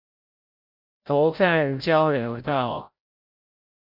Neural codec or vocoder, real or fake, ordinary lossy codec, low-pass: codec, 16 kHz, 0.5 kbps, FreqCodec, larger model; fake; MP3, 48 kbps; 5.4 kHz